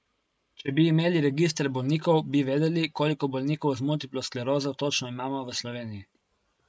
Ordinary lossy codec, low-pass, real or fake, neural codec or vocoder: none; none; real; none